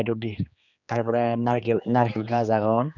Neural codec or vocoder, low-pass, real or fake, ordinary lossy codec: codec, 16 kHz, 2 kbps, X-Codec, HuBERT features, trained on general audio; 7.2 kHz; fake; none